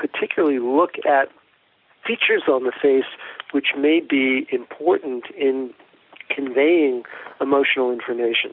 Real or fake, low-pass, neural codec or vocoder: real; 5.4 kHz; none